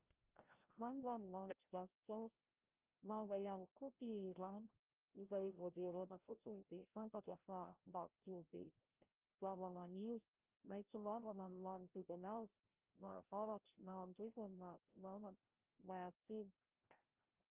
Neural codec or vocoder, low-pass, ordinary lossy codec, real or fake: codec, 16 kHz, 0.5 kbps, FreqCodec, larger model; 3.6 kHz; Opus, 24 kbps; fake